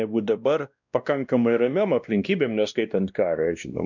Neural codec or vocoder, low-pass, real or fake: codec, 16 kHz, 1 kbps, X-Codec, WavLM features, trained on Multilingual LibriSpeech; 7.2 kHz; fake